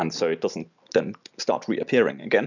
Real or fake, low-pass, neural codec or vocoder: real; 7.2 kHz; none